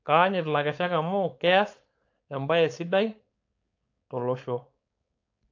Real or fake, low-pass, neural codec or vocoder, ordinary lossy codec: fake; 7.2 kHz; codec, 16 kHz, 4.8 kbps, FACodec; AAC, 48 kbps